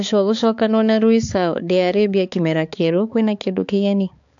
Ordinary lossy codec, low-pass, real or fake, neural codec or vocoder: AAC, 64 kbps; 7.2 kHz; fake; codec, 16 kHz, 4 kbps, X-Codec, HuBERT features, trained on balanced general audio